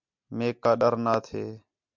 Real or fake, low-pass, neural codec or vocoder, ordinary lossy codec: real; 7.2 kHz; none; MP3, 64 kbps